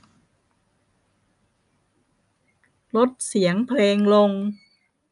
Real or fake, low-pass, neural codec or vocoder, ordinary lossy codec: real; 10.8 kHz; none; none